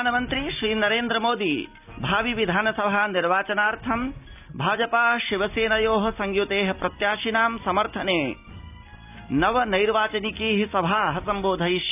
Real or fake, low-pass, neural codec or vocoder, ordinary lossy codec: real; 3.6 kHz; none; none